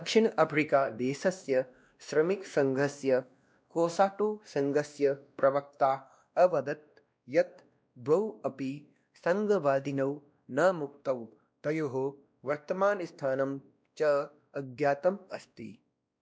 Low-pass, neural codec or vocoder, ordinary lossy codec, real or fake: none; codec, 16 kHz, 1 kbps, X-Codec, WavLM features, trained on Multilingual LibriSpeech; none; fake